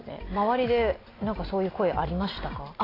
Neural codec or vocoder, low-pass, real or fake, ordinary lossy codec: none; 5.4 kHz; real; AAC, 24 kbps